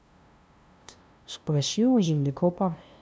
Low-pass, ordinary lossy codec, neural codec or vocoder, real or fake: none; none; codec, 16 kHz, 0.5 kbps, FunCodec, trained on LibriTTS, 25 frames a second; fake